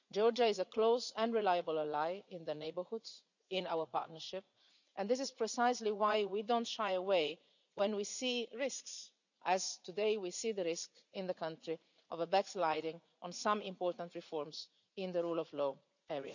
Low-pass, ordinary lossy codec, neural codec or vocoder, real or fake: 7.2 kHz; none; vocoder, 44.1 kHz, 80 mel bands, Vocos; fake